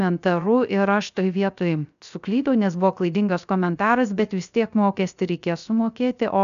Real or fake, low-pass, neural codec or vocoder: fake; 7.2 kHz; codec, 16 kHz, 0.3 kbps, FocalCodec